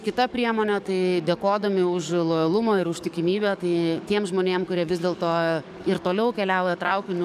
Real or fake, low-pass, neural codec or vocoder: fake; 14.4 kHz; codec, 44.1 kHz, 7.8 kbps, DAC